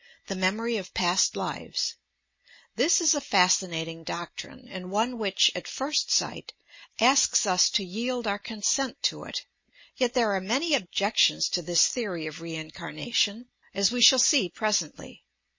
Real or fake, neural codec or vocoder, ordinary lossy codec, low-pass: real; none; MP3, 32 kbps; 7.2 kHz